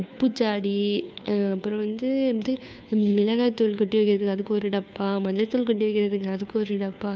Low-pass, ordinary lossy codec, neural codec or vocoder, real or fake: none; none; codec, 16 kHz, 2 kbps, FunCodec, trained on Chinese and English, 25 frames a second; fake